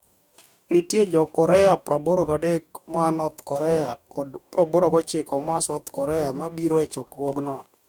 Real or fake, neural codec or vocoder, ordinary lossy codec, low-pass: fake; codec, 44.1 kHz, 2.6 kbps, DAC; none; 19.8 kHz